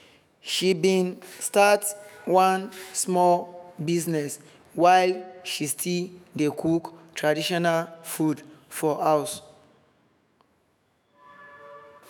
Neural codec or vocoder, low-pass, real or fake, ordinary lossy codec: autoencoder, 48 kHz, 128 numbers a frame, DAC-VAE, trained on Japanese speech; none; fake; none